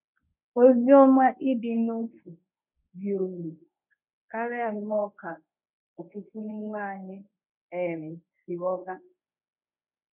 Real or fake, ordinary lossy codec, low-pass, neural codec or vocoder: fake; none; 3.6 kHz; codec, 24 kHz, 0.9 kbps, WavTokenizer, medium speech release version 1